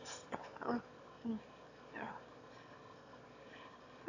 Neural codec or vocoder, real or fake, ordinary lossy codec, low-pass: autoencoder, 22.05 kHz, a latent of 192 numbers a frame, VITS, trained on one speaker; fake; AAC, 48 kbps; 7.2 kHz